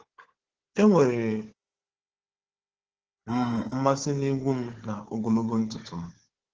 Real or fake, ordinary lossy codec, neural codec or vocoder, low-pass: fake; Opus, 16 kbps; codec, 16 kHz, 4 kbps, FunCodec, trained on Chinese and English, 50 frames a second; 7.2 kHz